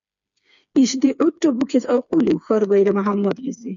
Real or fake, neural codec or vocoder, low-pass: fake; codec, 16 kHz, 4 kbps, FreqCodec, smaller model; 7.2 kHz